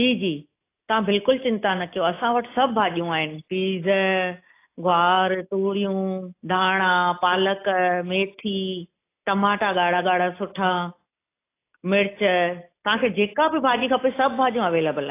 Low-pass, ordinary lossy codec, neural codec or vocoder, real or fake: 3.6 kHz; AAC, 24 kbps; none; real